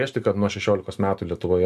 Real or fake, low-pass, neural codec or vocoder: real; 14.4 kHz; none